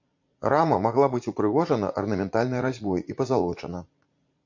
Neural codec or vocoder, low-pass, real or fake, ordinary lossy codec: vocoder, 44.1 kHz, 80 mel bands, Vocos; 7.2 kHz; fake; MP3, 48 kbps